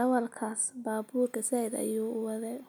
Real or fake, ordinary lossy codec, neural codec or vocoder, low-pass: real; none; none; none